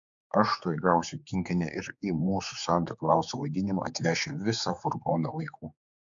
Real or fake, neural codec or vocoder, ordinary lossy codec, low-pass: fake; codec, 16 kHz, 4 kbps, X-Codec, HuBERT features, trained on general audio; AAC, 64 kbps; 7.2 kHz